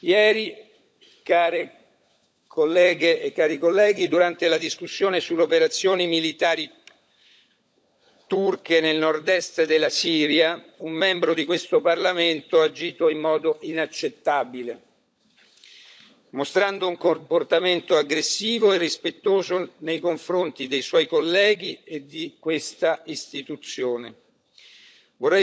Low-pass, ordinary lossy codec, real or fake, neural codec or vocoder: none; none; fake; codec, 16 kHz, 16 kbps, FunCodec, trained on LibriTTS, 50 frames a second